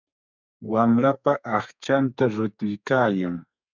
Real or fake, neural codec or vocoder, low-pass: fake; codec, 32 kHz, 1.9 kbps, SNAC; 7.2 kHz